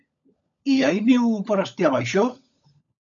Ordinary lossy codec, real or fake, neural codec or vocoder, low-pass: MP3, 64 kbps; fake; codec, 16 kHz, 16 kbps, FunCodec, trained on LibriTTS, 50 frames a second; 7.2 kHz